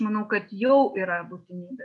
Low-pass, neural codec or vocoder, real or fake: 10.8 kHz; none; real